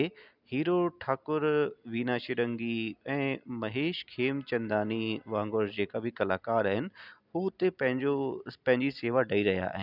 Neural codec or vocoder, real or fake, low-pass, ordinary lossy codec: none; real; 5.4 kHz; none